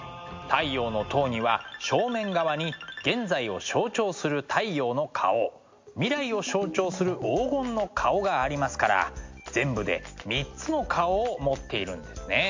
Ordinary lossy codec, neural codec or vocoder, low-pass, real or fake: MP3, 48 kbps; none; 7.2 kHz; real